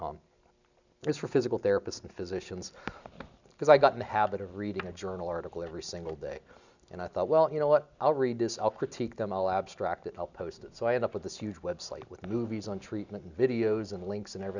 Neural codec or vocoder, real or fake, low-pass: none; real; 7.2 kHz